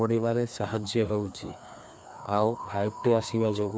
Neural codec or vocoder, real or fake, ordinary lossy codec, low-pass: codec, 16 kHz, 2 kbps, FreqCodec, larger model; fake; none; none